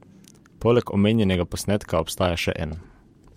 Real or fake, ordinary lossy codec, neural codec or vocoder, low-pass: fake; MP3, 64 kbps; vocoder, 44.1 kHz, 128 mel bands every 512 samples, BigVGAN v2; 19.8 kHz